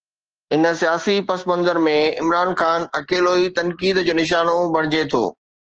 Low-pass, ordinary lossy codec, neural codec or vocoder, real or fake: 7.2 kHz; Opus, 16 kbps; codec, 16 kHz, 6 kbps, DAC; fake